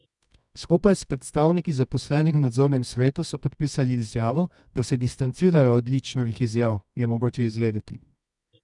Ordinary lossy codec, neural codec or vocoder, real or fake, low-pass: none; codec, 24 kHz, 0.9 kbps, WavTokenizer, medium music audio release; fake; 10.8 kHz